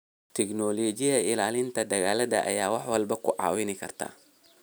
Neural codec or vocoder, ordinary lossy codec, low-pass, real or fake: none; none; none; real